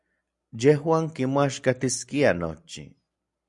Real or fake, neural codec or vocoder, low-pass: real; none; 10.8 kHz